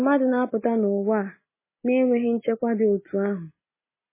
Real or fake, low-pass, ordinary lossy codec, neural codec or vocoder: real; 3.6 kHz; MP3, 16 kbps; none